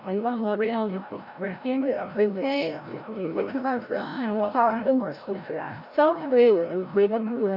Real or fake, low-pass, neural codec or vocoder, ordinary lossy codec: fake; 5.4 kHz; codec, 16 kHz, 0.5 kbps, FreqCodec, larger model; none